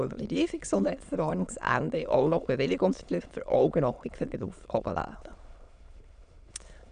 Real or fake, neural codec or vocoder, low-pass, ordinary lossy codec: fake; autoencoder, 22.05 kHz, a latent of 192 numbers a frame, VITS, trained on many speakers; 9.9 kHz; none